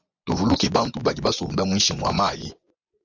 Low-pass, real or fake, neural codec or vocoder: 7.2 kHz; fake; vocoder, 24 kHz, 100 mel bands, Vocos